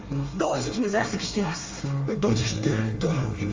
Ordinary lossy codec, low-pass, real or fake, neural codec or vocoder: Opus, 32 kbps; 7.2 kHz; fake; codec, 24 kHz, 1 kbps, SNAC